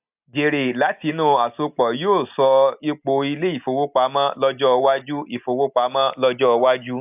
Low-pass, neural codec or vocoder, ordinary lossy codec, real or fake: 3.6 kHz; none; AAC, 32 kbps; real